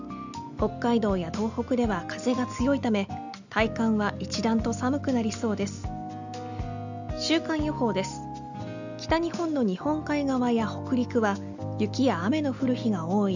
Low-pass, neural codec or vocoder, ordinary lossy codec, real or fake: 7.2 kHz; none; none; real